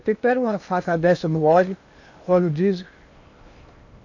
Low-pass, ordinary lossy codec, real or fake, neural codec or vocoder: 7.2 kHz; none; fake; codec, 16 kHz in and 24 kHz out, 0.8 kbps, FocalCodec, streaming, 65536 codes